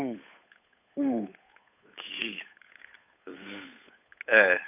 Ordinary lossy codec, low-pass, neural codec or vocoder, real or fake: none; 3.6 kHz; codec, 16 kHz, 8 kbps, FunCodec, trained on Chinese and English, 25 frames a second; fake